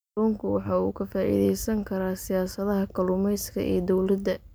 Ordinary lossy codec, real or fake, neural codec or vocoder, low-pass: none; real; none; none